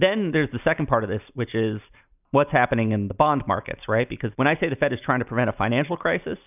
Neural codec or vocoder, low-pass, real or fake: none; 3.6 kHz; real